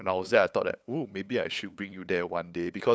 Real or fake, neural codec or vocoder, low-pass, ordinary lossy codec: fake; codec, 16 kHz, 8 kbps, FunCodec, trained on LibriTTS, 25 frames a second; none; none